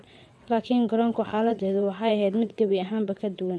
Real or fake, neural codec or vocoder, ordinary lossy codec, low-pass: fake; vocoder, 22.05 kHz, 80 mel bands, WaveNeXt; none; none